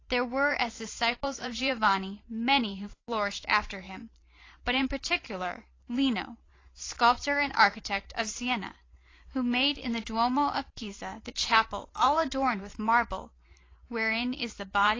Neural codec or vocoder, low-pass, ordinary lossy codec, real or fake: vocoder, 44.1 kHz, 128 mel bands every 512 samples, BigVGAN v2; 7.2 kHz; AAC, 32 kbps; fake